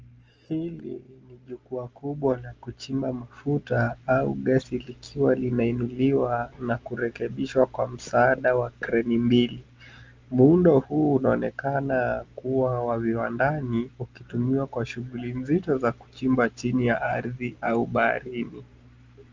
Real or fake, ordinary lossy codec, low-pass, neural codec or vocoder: real; Opus, 24 kbps; 7.2 kHz; none